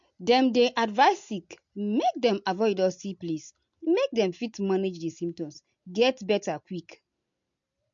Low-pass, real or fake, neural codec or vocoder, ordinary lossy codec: 7.2 kHz; real; none; MP3, 48 kbps